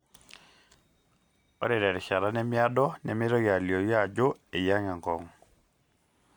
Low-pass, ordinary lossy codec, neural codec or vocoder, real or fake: 19.8 kHz; MP3, 96 kbps; none; real